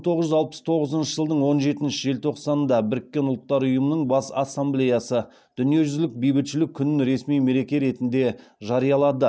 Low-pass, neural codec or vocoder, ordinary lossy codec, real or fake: none; none; none; real